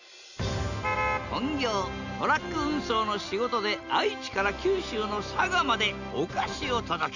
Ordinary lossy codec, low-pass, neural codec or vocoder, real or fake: none; 7.2 kHz; none; real